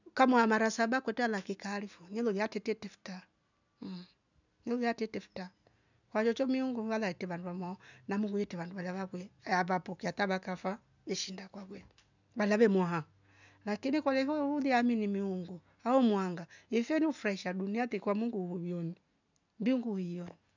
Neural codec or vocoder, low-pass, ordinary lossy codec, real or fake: none; 7.2 kHz; none; real